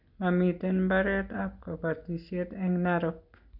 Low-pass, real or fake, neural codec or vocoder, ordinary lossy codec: 5.4 kHz; real; none; none